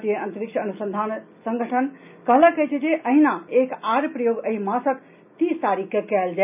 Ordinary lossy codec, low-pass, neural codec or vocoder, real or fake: none; 3.6 kHz; none; real